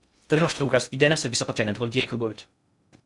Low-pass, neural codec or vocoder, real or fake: 10.8 kHz; codec, 16 kHz in and 24 kHz out, 0.6 kbps, FocalCodec, streaming, 2048 codes; fake